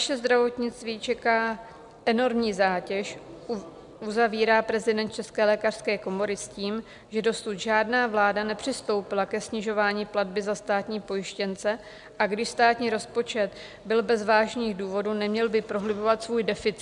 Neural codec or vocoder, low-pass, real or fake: none; 10.8 kHz; real